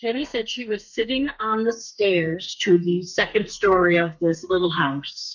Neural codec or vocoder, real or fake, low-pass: codec, 32 kHz, 1.9 kbps, SNAC; fake; 7.2 kHz